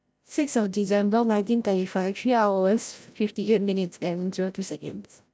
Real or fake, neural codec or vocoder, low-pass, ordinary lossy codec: fake; codec, 16 kHz, 0.5 kbps, FreqCodec, larger model; none; none